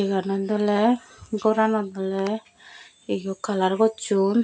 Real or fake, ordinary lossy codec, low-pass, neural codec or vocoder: real; none; none; none